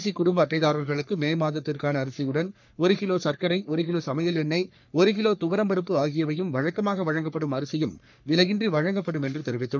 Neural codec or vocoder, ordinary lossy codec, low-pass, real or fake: codec, 44.1 kHz, 3.4 kbps, Pupu-Codec; none; 7.2 kHz; fake